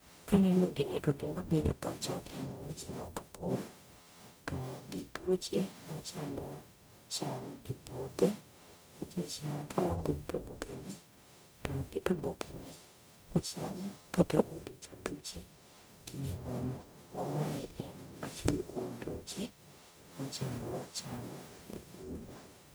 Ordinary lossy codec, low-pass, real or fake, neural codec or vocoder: none; none; fake; codec, 44.1 kHz, 0.9 kbps, DAC